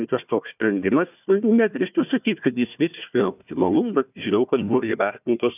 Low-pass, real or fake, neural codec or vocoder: 3.6 kHz; fake; codec, 16 kHz, 1 kbps, FunCodec, trained on Chinese and English, 50 frames a second